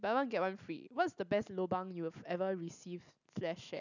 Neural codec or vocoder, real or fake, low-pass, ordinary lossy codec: none; real; 7.2 kHz; none